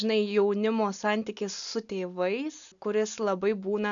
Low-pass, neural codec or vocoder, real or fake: 7.2 kHz; none; real